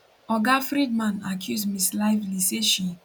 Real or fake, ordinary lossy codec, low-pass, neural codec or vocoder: real; none; 19.8 kHz; none